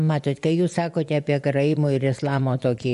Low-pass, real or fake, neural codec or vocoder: 10.8 kHz; fake; vocoder, 24 kHz, 100 mel bands, Vocos